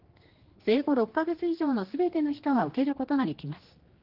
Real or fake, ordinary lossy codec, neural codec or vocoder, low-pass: fake; Opus, 16 kbps; codec, 16 kHz, 2 kbps, X-Codec, HuBERT features, trained on general audio; 5.4 kHz